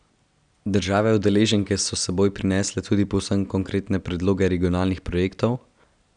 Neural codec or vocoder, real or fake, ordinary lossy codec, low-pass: none; real; none; 9.9 kHz